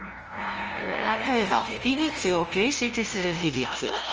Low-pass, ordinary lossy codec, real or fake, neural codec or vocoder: 7.2 kHz; Opus, 24 kbps; fake; codec, 16 kHz, 0.5 kbps, FunCodec, trained on LibriTTS, 25 frames a second